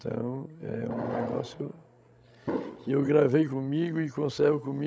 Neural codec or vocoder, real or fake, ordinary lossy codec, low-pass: codec, 16 kHz, 16 kbps, FreqCodec, larger model; fake; none; none